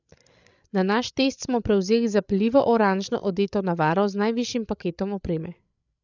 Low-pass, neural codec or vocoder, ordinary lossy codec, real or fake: 7.2 kHz; codec, 16 kHz, 8 kbps, FreqCodec, larger model; none; fake